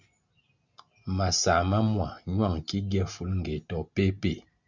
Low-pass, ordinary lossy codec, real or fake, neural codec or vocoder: 7.2 kHz; Opus, 64 kbps; real; none